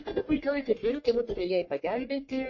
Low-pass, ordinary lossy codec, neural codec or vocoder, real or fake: 7.2 kHz; MP3, 32 kbps; codec, 44.1 kHz, 1.7 kbps, Pupu-Codec; fake